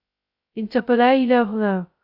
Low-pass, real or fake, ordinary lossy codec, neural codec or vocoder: 5.4 kHz; fake; Opus, 64 kbps; codec, 16 kHz, 0.2 kbps, FocalCodec